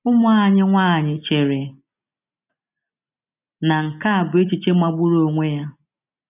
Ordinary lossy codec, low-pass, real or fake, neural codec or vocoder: none; 3.6 kHz; real; none